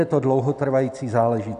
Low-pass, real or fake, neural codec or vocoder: 10.8 kHz; real; none